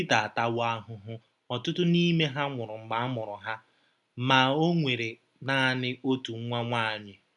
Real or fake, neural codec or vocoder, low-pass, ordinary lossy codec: real; none; 10.8 kHz; none